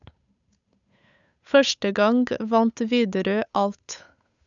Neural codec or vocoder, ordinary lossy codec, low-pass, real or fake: codec, 16 kHz, 8 kbps, FunCodec, trained on Chinese and English, 25 frames a second; none; 7.2 kHz; fake